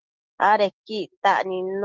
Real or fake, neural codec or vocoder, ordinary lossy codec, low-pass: real; none; Opus, 16 kbps; 7.2 kHz